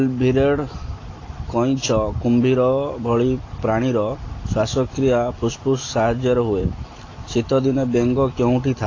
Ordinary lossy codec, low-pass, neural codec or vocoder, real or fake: AAC, 32 kbps; 7.2 kHz; none; real